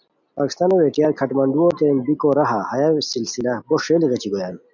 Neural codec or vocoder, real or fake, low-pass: none; real; 7.2 kHz